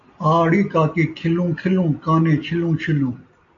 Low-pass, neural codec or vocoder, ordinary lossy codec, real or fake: 7.2 kHz; none; AAC, 64 kbps; real